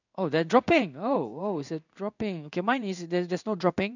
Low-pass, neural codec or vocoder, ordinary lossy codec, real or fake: 7.2 kHz; codec, 16 kHz in and 24 kHz out, 1 kbps, XY-Tokenizer; none; fake